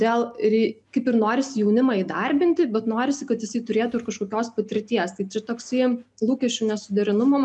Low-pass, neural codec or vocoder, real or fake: 10.8 kHz; none; real